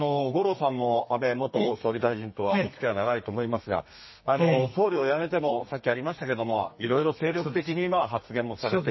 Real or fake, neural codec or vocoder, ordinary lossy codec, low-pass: fake; codec, 32 kHz, 1.9 kbps, SNAC; MP3, 24 kbps; 7.2 kHz